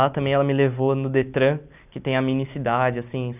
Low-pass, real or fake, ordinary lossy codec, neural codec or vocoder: 3.6 kHz; real; none; none